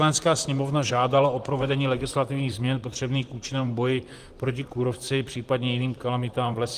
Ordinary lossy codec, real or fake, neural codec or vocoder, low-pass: Opus, 24 kbps; fake; vocoder, 44.1 kHz, 128 mel bands, Pupu-Vocoder; 14.4 kHz